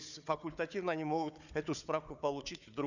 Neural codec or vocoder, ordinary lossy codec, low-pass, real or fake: codec, 16 kHz, 4 kbps, FunCodec, trained on Chinese and English, 50 frames a second; none; 7.2 kHz; fake